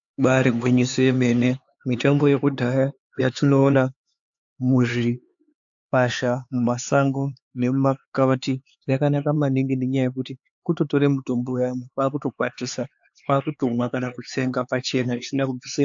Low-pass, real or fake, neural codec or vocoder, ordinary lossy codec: 7.2 kHz; fake; codec, 16 kHz, 4 kbps, X-Codec, HuBERT features, trained on LibriSpeech; AAC, 64 kbps